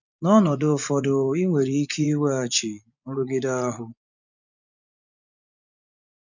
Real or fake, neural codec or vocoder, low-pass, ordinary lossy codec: fake; vocoder, 24 kHz, 100 mel bands, Vocos; 7.2 kHz; none